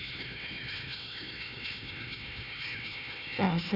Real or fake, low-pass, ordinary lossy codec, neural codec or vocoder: fake; 5.4 kHz; none; codec, 16 kHz, 1 kbps, FunCodec, trained on Chinese and English, 50 frames a second